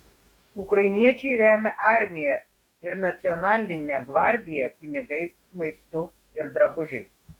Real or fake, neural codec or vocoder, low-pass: fake; codec, 44.1 kHz, 2.6 kbps, DAC; 19.8 kHz